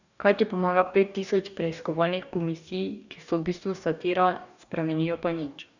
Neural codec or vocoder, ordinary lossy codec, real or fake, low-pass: codec, 44.1 kHz, 2.6 kbps, DAC; none; fake; 7.2 kHz